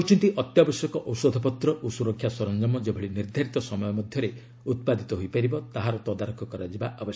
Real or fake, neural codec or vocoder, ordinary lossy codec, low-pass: real; none; none; none